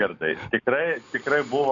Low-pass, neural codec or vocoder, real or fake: 7.2 kHz; none; real